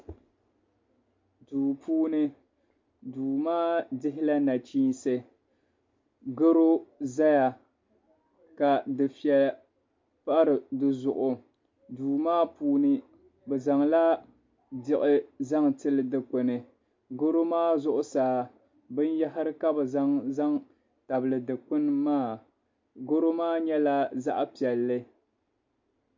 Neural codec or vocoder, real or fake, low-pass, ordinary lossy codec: none; real; 7.2 kHz; MP3, 48 kbps